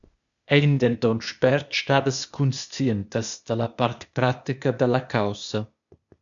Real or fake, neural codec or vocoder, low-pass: fake; codec, 16 kHz, 0.8 kbps, ZipCodec; 7.2 kHz